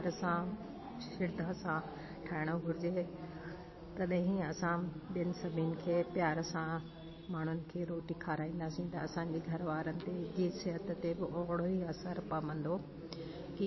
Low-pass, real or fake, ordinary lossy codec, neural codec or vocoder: 7.2 kHz; real; MP3, 24 kbps; none